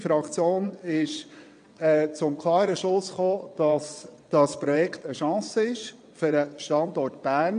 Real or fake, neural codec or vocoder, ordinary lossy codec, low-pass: fake; vocoder, 22.05 kHz, 80 mel bands, WaveNeXt; AAC, 64 kbps; 9.9 kHz